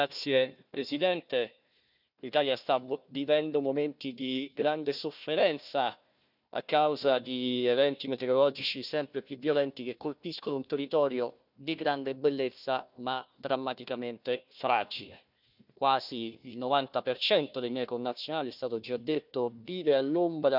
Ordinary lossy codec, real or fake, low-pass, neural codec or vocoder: none; fake; 5.4 kHz; codec, 16 kHz, 1 kbps, FunCodec, trained on Chinese and English, 50 frames a second